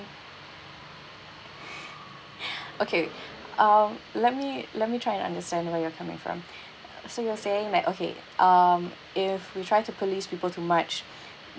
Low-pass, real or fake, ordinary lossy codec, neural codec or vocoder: none; real; none; none